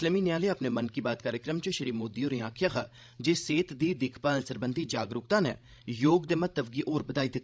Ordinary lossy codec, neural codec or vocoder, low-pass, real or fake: none; codec, 16 kHz, 16 kbps, FreqCodec, larger model; none; fake